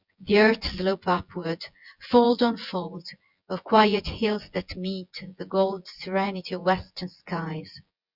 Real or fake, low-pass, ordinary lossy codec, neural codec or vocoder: fake; 5.4 kHz; Opus, 64 kbps; vocoder, 24 kHz, 100 mel bands, Vocos